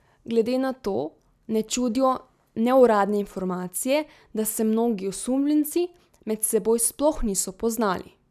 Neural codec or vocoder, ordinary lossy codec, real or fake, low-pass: none; none; real; 14.4 kHz